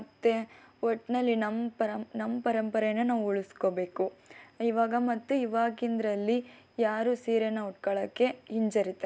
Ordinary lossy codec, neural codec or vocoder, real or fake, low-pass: none; none; real; none